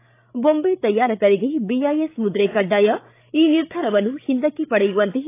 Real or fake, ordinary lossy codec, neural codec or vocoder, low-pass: fake; AAC, 24 kbps; codec, 16 kHz, 8 kbps, FreqCodec, larger model; 3.6 kHz